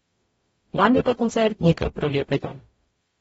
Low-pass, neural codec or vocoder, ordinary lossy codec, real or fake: 19.8 kHz; codec, 44.1 kHz, 0.9 kbps, DAC; AAC, 24 kbps; fake